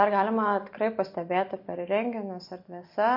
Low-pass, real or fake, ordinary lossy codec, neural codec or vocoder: 5.4 kHz; real; MP3, 32 kbps; none